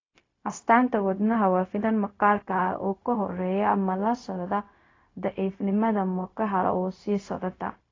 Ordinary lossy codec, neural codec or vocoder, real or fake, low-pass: AAC, 32 kbps; codec, 16 kHz, 0.4 kbps, LongCat-Audio-Codec; fake; 7.2 kHz